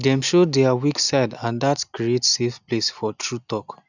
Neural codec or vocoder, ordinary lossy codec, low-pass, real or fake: none; none; 7.2 kHz; real